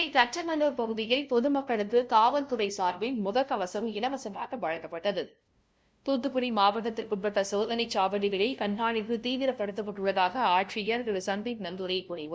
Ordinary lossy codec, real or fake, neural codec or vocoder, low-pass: none; fake; codec, 16 kHz, 0.5 kbps, FunCodec, trained on LibriTTS, 25 frames a second; none